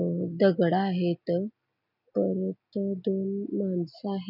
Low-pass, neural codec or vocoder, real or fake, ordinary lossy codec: 5.4 kHz; none; real; none